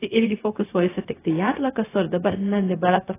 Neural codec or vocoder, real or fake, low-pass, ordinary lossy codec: codec, 16 kHz, 0.4 kbps, LongCat-Audio-Codec; fake; 3.6 kHz; AAC, 16 kbps